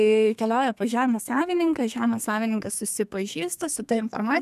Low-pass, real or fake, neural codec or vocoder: 14.4 kHz; fake; codec, 32 kHz, 1.9 kbps, SNAC